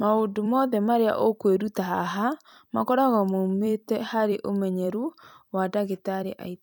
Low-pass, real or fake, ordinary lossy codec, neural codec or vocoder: none; fake; none; vocoder, 44.1 kHz, 128 mel bands every 256 samples, BigVGAN v2